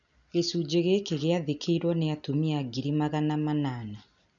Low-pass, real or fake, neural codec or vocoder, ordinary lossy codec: 7.2 kHz; real; none; none